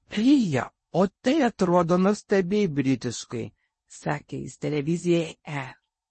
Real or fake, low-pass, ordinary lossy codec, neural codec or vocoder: fake; 10.8 kHz; MP3, 32 kbps; codec, 16 kHz in and 24 kHz out, 0.8 kbps, FocalCodec, streaming, 65536 codes